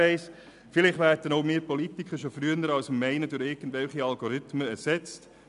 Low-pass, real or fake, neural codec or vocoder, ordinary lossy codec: 10.8 kHz; real; none; none